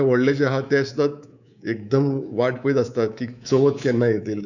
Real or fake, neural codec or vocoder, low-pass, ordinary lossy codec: fake; codec, 24 kHz, 3.1 kbps, DualCodec; 7.2 kHz; none